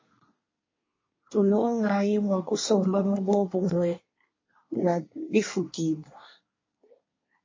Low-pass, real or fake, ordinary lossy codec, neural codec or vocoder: 7.2 kHz; fake; MP3, 32 kbps; codec, 24 kHz, 1 kbps, SNAC